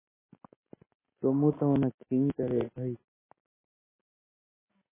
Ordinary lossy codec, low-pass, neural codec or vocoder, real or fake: MP3, 16 kbps; 3.6 kHz; none; real